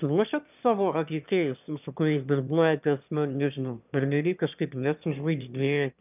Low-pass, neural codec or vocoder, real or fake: 3.6 kHz; autoencoder, 22.05 kHz, a latent of 192 numbers a frame, VITS, trained on one speaker; fake